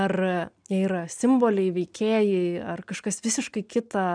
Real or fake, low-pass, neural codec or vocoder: real; 9.9 kHz; none